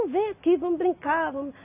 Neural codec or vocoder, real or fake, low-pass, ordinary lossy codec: none; real; 3.6 kHz; AAC, 24 kbps